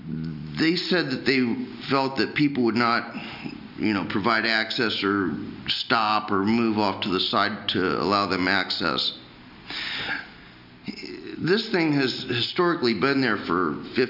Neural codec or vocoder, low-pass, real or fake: none; 5.4 kHz; real